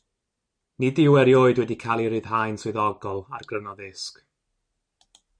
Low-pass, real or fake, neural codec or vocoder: 9.9 kHz; real; none